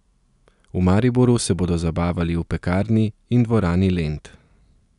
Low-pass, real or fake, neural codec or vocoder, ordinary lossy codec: 10.8 kHz; real; none; none